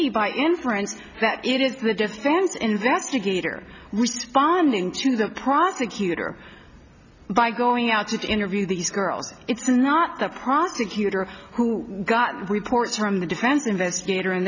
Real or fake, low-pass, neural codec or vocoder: real; 7.2 kHz; none